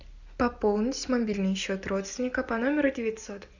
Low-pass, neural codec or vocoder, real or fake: 7.2 kHz; none; real